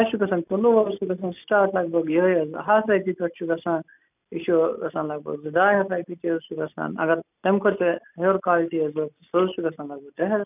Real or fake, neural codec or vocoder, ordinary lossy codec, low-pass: real; none; none; 3.6 kHz